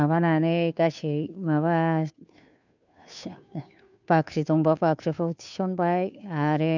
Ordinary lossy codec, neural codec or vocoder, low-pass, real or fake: none; codec, 16 kHz, 2 kbps, FunCodec, trained on Chinese and English, 25 frames a second; 7.2 kHz; fake